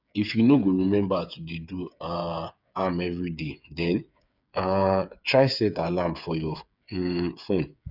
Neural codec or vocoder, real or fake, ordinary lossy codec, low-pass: codec, 16 kHz, 8 kbps, FreqCodec, smaller model; fake; none; 5.4 kHz